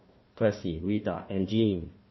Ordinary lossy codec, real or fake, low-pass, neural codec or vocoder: MP3, 24 kbps; fake; 7.2 kHz; codec, 16 kHz, 1 kbps, FunCodec, trained on Chinese and English, 50 frames a second